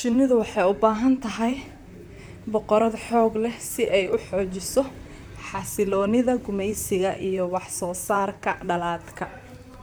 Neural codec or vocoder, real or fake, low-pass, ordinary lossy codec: vocoder, 44.1 kHz, 128 mel bands, Pupu-Vocoder; fake; none; none